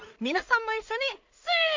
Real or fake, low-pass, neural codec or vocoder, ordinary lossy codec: fake; 7.2 kHz; codec, 16 kHz in and 24 kHz out, 0.4 kbps, LongCat-Audio-Codec, two codebook decoder; MP3, 64 kbps